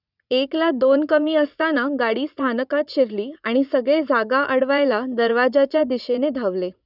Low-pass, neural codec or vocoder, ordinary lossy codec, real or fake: 5.4 kHz; vocoder, 44.1 kHz, 80 mel bands, Vocos; none; fake